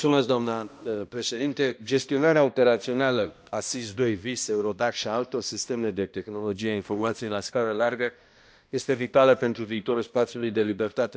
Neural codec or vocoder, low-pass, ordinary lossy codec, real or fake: codec, 16 kHz, 1 kbps, X-Codec, HuBERT features, trained on balanced general audio; none; none; fake